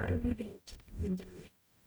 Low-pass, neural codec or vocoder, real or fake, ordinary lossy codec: none; codec, 44.1 kHz, 0.9 kbps, DAC; fake; none